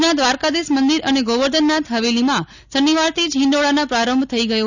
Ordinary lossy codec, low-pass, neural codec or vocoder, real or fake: none; 7.2 kHz; none; real